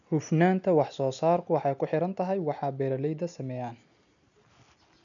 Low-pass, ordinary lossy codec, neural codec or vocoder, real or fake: 7.2 kHz; none; none; real